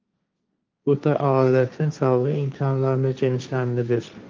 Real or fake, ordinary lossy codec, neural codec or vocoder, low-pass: fake; Opus, 24 kbps; codec, 16 kHz, 1.1 kbps, Voila-Tokenizer; 7.2 kHz